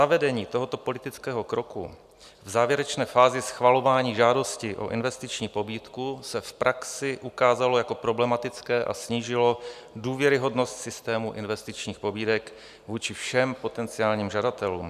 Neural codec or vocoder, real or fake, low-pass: none; real; 14.4 kHz